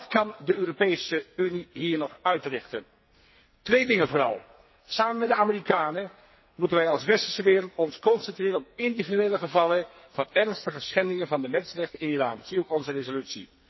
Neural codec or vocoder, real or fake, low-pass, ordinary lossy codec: codec, 44.1 kHz, 2.6 kbps, SNAC; fake; 7.2 kHz; MP3, 24 kbps